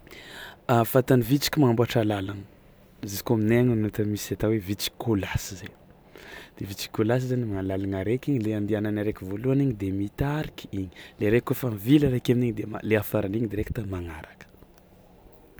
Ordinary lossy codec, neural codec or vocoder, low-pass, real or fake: none; none; none; real